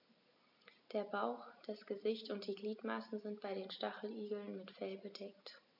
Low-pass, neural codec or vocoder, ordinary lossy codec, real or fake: 5.4 kHz; none; none; real